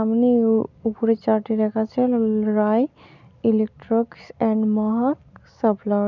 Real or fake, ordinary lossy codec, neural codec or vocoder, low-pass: real; none; none; 7.2 kHz